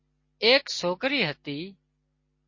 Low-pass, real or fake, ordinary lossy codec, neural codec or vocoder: 7.2 kHz; real; MP3, 32 kbps; none